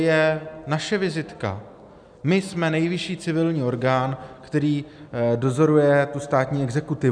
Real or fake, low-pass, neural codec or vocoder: real; 9.9 kHz; none